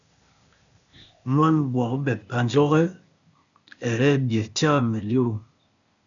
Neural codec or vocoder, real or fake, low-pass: codec, 16 kHz, 0.8 kbps, ZipCodec; fake; 7.2 kHz